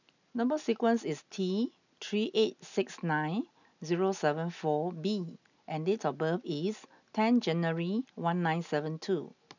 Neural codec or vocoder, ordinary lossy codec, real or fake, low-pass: none; none; real; 7.2 kHz